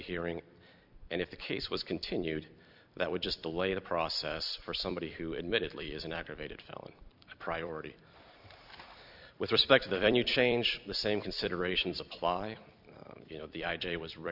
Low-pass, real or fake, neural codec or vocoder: 5.4 kHz; fake; vocoder, 44.1 kHz, 128 mel bands every 256 samples, BigVGAN v2